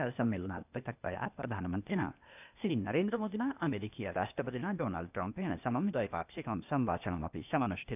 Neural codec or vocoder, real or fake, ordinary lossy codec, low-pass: codec, 16 kHz, 0.8 kbps, ZipCodec; fake; none; 3.6 kHz